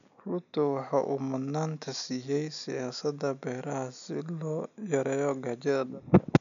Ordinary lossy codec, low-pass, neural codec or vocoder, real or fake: none; 7.2 kHz; none; real